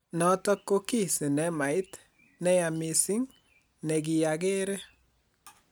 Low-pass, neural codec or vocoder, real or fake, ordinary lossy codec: none; none; real; none